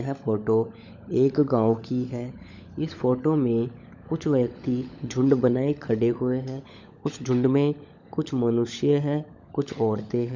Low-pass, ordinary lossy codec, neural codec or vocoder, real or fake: 7.2 kHz; none; codec, 16 kHz, 16 kbps, FunCodec, trained on LibriTTS, 50 frames a second; fake